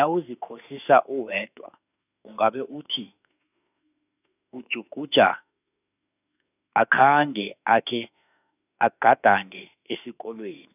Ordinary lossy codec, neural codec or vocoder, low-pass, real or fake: none; autoencoder, 48 kHz, 32 numbers a frame, DAC-VAE, trained on Japanese speech; 3.6 kHz; fake